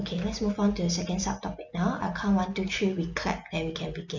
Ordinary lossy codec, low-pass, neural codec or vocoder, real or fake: none; 7.2 kHz; none; real